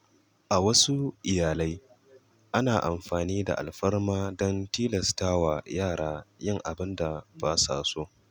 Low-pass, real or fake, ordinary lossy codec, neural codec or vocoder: 19.8 kHz; real; none; none